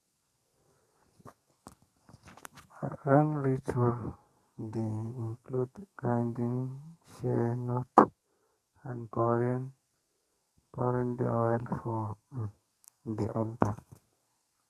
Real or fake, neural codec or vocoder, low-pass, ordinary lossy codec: fake; codec, 44.1 kHz, 2.6 kbps, SNAC; 14.4 kHz; Opus, 64 kbps